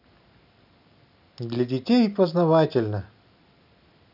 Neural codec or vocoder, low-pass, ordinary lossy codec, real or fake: none; 5.4 kHz; none; real